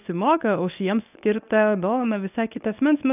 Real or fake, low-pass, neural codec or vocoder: fake; 3.6 kHz; codec, 24 kHz, 0.9 kbps, WavTokenizer, medium speech release version 1